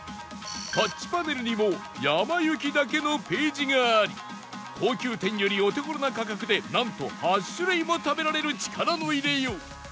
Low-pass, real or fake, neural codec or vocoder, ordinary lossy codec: none; real; none; none